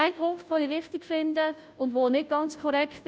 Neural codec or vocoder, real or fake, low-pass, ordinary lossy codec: codec, 16 kHz, 0.5 kbps, FunCodec, trained on Chinese and English, 25 frames a second; fake; none; none